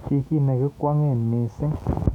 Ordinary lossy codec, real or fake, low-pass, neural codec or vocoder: none; fake; 19.8 kHz; autoencoder, 48 kHz, 128 numbers a frame, DAC-VAE, trained on Japanese speech